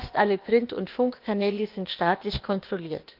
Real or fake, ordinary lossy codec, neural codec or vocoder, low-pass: fake; Opus, 32 kbps; codec, 16 kHz, 0.8 kbps, ZipCodec; 5.4 kHz